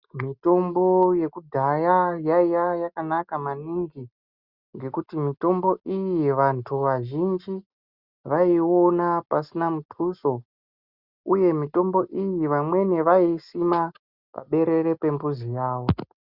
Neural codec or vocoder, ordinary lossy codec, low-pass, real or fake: none; Opus, 64 kbps; 5.4 kHz; real